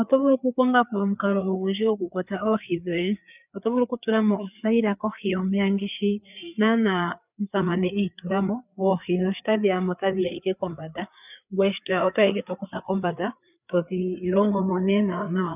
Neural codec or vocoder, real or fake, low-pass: codec, 16 kHz, 4 kbps, FreqCodec, larger model; fake; 3.6 kHz